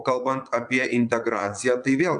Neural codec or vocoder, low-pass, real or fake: vocoder, 22.05 kHz, 80 mel bands, Vocos; 9.9 kHz; fake